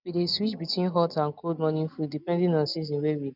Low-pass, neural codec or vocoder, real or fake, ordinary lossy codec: 5.4 kHz; none; real; none